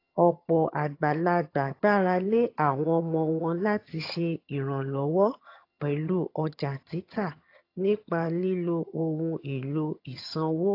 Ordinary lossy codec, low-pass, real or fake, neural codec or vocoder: AAC, 32 kbps; 5.4 kHz; fake; vocoder, 22.05 kHz, 80 mel bands, HiFi-GAN